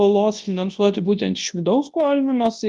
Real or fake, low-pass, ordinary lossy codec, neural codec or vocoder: fake; 10.8 kHz; Opus, 32 kbps; codec, 24 kHz, 0.9 kbps, WavTokenizer, large speech release